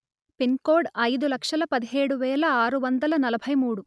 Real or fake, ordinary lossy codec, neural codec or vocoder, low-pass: real; none; none; none